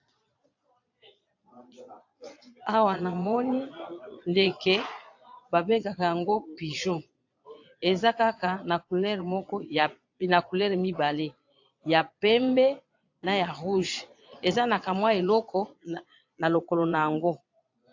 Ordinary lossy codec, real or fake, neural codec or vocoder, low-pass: AAC, 48 kbps; fake; vocoder, 22.05 kHz, 80 mel bands, Vocos; 7.2 kHz